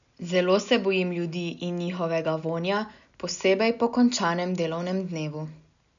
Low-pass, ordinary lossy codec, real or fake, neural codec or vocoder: 7.2 kHz; none; real; none